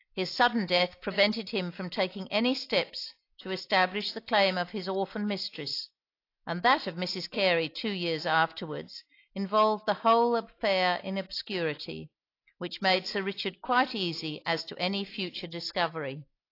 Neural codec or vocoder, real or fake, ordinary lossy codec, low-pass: none; real; AAC, 32 kbps; 5.4 kHz